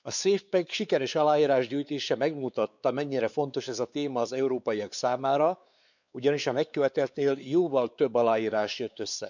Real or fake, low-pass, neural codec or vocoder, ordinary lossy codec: fake; 7.2 kHz; codec, 16 kHz, 4 kbps, X-Codec, WavLM features, trained on Multilingual LibriSpeech; none